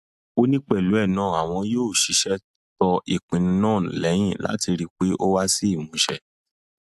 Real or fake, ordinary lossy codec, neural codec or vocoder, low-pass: real; AAC, 96 kbps; none; 14.4 kHz